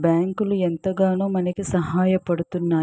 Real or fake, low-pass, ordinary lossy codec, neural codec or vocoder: real; none; none; none